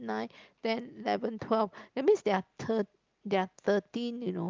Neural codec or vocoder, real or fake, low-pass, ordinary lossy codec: none; real; 7.2 kHz; Opus, 32 kbps